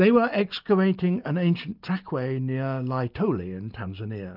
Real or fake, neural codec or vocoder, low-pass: real; none; 5.4 kHz